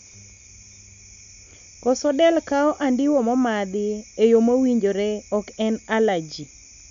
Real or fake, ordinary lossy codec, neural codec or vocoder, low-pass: real; none; none; 7.2 kHz